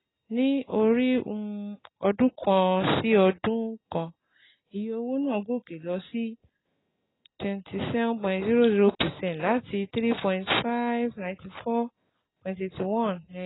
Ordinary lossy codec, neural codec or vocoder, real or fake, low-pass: AAC, 16 kbps; none; real; 7.2 kHz